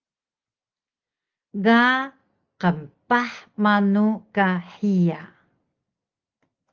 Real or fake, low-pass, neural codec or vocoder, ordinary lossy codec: real; 7.2 kHz; none; Opus, 32 kbps